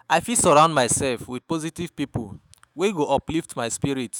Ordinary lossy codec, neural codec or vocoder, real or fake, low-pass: none; autoencoder, 48 kHz, 128 numbers a frame, DAC-VAE, trained on Japanese speech; fake; none